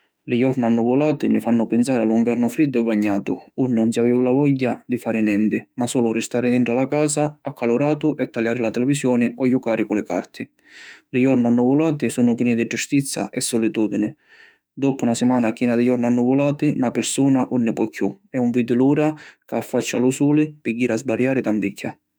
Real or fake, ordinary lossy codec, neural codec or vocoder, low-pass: fake; none; autoencoder, 48 kHz, 32 numbers a frame, DAC-VAE, trained on Japanese speech; none